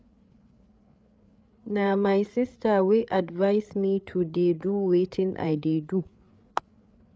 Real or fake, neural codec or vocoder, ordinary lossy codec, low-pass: fake; codec, 16 kHz, 8 kbps, FreqCodec, larger model; none; none